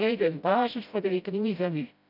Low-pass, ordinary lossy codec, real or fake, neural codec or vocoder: 5.4 kHz; none; fake; codec, 16 kHz, 0.5 kbps, FreqCodec, smaller model